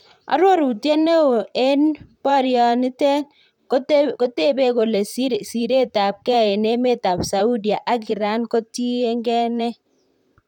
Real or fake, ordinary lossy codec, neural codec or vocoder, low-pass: fake; none; vocoder, 44.1 kHz, 128 mel bands, Pupu-Vocoder; 19.8 kHz